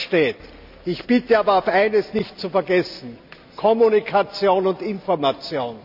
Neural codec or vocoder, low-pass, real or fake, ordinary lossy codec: none; 5.4 kHz; real; none